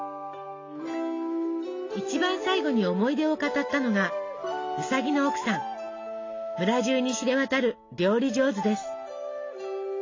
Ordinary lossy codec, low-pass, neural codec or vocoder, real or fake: AAC, 32 kbps; 7.2 kHz; none; real